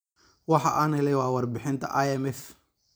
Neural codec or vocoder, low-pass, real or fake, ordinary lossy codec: vocoder, 44.1 kHz, 128 mel bands every 256 samples, BigVGAN v2; none; fake; none